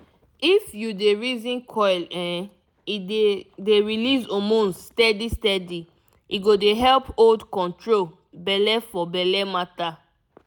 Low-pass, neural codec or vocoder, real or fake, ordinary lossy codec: 19.8 kHz; none; real; none